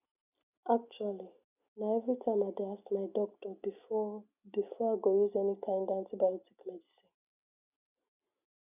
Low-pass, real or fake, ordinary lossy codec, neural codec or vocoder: 3.6 kHz; real; none; none